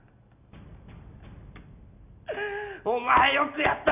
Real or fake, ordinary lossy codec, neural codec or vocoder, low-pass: real; none; none; 3.6 kHz